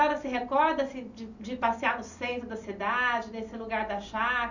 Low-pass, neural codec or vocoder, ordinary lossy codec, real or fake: 7.2 kHz; none; none; real